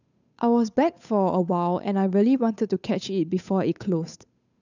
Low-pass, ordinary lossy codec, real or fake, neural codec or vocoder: 7.2 kHz; none; fake; codec, 16 kHz, 8 kbps, FunCodec, trained on Chinese and English, 25 frames a second